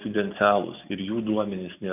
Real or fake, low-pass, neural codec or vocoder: real; 3.6 kHz; none